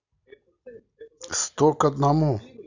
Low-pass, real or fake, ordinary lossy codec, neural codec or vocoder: 7.2 kHz; real; none; none